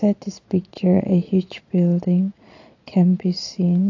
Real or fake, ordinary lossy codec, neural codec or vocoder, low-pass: fake; AAC, 48 kbps; vocoder, 22.05 kHz, 80 mel bands, Vocos; 7.2 kHz